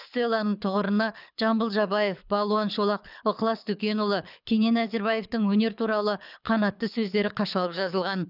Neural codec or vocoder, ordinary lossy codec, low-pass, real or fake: codec, 24 kHz, 6 kbps, HILCodec; none; 5.4 kHz; fake